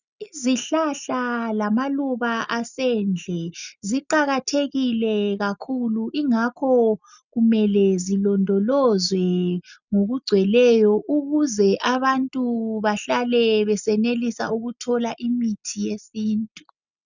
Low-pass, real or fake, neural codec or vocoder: 7.2 kHz; real; none